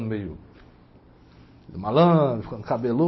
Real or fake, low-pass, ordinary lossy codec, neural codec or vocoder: real; 7.2 kHz; MP3, 24 kbps; none